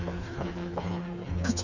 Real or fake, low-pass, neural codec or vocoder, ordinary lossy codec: fake; 7.2 kHz; codec, 16 kHz, 4 kbps, FreqCodec, smaller model; none